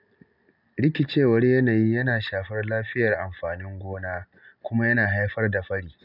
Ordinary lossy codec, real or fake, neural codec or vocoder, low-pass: none; real; none; 5.4 kHz